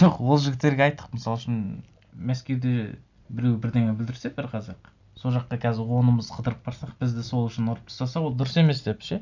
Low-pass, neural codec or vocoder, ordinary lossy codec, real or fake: 7.2 kHz; none; none; real